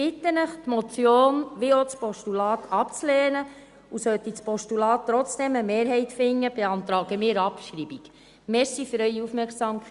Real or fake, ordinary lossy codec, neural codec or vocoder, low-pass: real; Opus, 64 kbps; none; 10.8 kHz